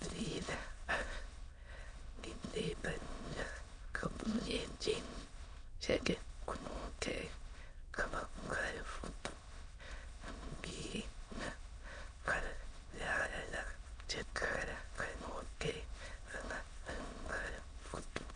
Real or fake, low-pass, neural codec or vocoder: fake; 9.9 kHz; autoencoder, 22.05 kHz, a latent of 192 numbers a frame, VITS, trained on many speakers